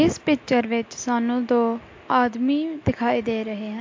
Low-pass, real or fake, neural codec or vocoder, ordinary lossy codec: 7.2 kHz; real; none; MP3, 48 kbps